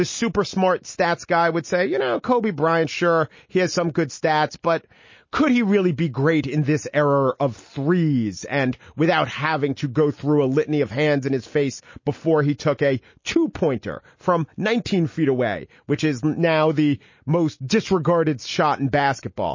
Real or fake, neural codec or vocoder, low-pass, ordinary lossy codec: real; none; 7.2 kHz; MP3, 32 kbps